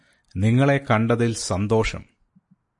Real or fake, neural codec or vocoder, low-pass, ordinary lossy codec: real; none; 10.8 kHz; MP3, 48 kbps